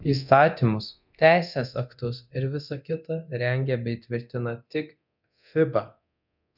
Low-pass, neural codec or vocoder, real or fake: 5.4 kHz; codec, 24 kHz, 0.9 kbps, DualCodec; fake